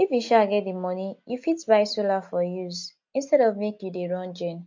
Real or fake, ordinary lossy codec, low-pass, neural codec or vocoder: real; MP3, 48 kbps; 7.2 kHz; none